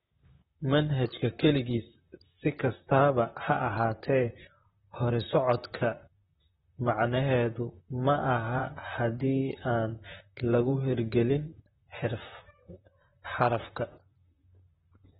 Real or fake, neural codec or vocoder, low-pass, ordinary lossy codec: fake; vocoder, 44.1 kHz, 128 mel bands, Pupu-Vocoder; 19.8 kHz; AAC, 16 kbps